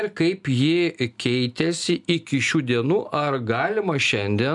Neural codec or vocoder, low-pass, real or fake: none; 10.8 kHz; real